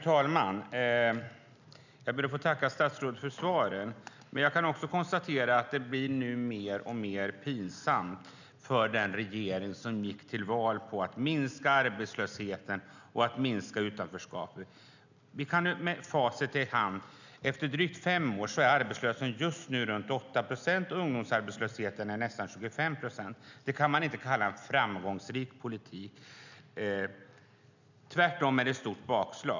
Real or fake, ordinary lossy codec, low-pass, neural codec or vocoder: real; none; 7.2 kHz; none